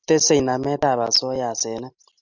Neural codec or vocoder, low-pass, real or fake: none; 7.2 kHz; real